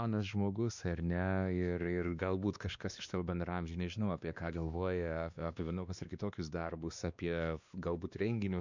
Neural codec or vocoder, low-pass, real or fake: codec, 16 kHz, 2 kbps, X-Codec, WavLM features, trained on Multilingual LibriSpeech; 7.2 kHz; fake